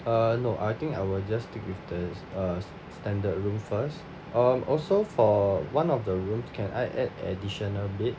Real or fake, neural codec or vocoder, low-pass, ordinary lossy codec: real; none; none; none